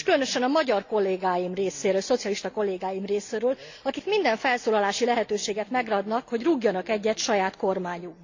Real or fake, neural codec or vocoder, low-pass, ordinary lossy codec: real; none; 7.2 kHz; AAC, 48 kbps